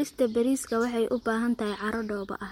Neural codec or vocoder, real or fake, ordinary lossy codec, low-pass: none; real; MP3, 64 kbps; 19.8 kHz